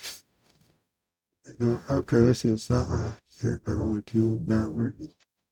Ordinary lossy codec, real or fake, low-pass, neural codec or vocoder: none; fake; 19.8 kHz; codec, 44.1 kHz, 0.9 kbps, DAC